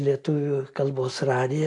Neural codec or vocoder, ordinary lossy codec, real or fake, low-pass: none; AAC, 48 kbps; real; 10.8 kHz